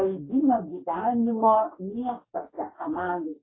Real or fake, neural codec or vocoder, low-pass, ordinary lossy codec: fake; codec, 44.1 kHz, 2.6 kbps, DAC; 7.2 kHz; AAC, 16 kbps